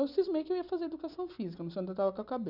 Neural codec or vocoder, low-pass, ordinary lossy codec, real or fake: none; 5.4 kHz; none; real